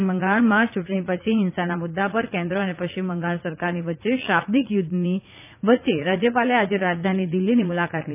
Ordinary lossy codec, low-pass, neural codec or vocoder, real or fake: none; 3.6 kHz; vocoder, 44.1 kHz, 80 mel bands, Vocos; fake